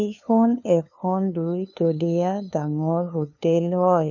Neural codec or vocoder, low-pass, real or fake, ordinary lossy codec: codec, 16 kHz, 2 kbps, FunCodec, trained on LibriTTS, 25 frames a second; 7.2 kHz; fake; none